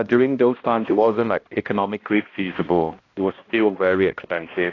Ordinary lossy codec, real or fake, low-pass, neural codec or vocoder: AAC, 32 kbps; fake; 7.2 kHz; codec, 16 kHz, 1 kbps, X-Codec, HuBERT features, trained on balanced general audio